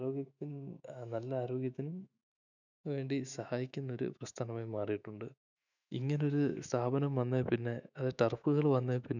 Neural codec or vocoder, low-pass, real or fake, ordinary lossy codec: autoencoder, 48 kHz, 128 numbers a frame, DAC-VAE, trained on Japanese speech; 7.2 kHz; fake; none